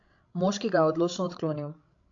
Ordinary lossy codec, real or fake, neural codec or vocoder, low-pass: AAC, 48 kbps; fake; codec, 16 kHz, 16 kbps, FreqCodec, larger model; 7.2 kHz